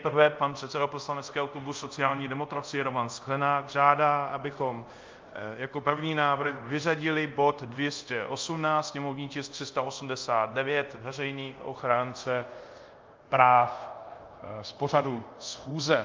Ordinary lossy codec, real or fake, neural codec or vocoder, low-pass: Opus, 24 kbps; fake; codec, 24 kHz, 0.5 kbps, DualCodec; 7.2 kHz